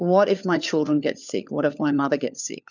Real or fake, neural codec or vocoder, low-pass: fake; codec, 16 kHz, 4 kbps, FunCodec, trained on LibriTTS, 50 frames a second; 7.2 kHz